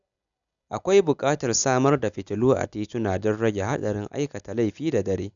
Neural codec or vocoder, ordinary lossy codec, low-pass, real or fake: none; none; 7.2 kHz; real